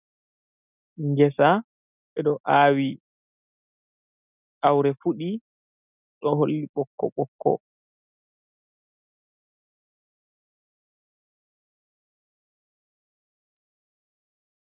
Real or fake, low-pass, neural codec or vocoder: real; 3.6 kHz; none